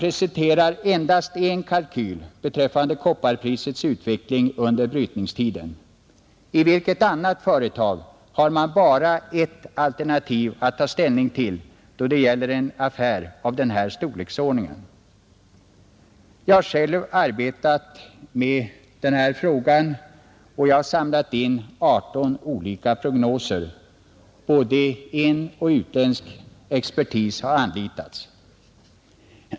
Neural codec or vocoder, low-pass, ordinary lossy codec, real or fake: none; none; none; real